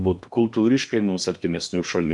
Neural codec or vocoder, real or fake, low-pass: codec, 16 kHz in and 24 kHz out, 0.8 kbps, FocalCodec, streaming, 65536 codes; fake; 10.8 kHz